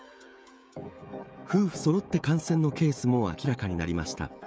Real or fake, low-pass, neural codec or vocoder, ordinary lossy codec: fake; none; codec, 16 kHz, 16 kbps, FreqCodec, smaller model; none